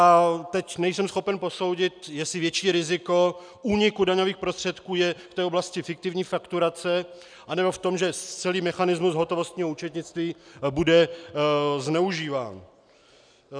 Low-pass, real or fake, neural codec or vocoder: 9.9 kHz; real; none